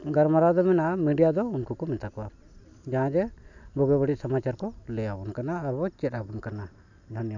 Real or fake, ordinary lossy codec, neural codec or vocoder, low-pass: real; none; none; 7.2 kHz